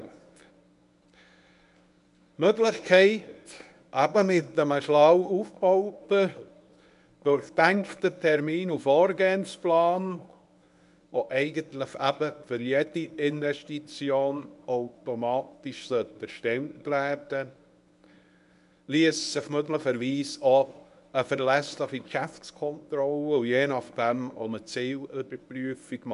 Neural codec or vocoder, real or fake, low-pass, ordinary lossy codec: codec, 24 kHz, 0.9 kbps, WavTokenizer, medium speech release version 1; fake; 10.8 kHz; none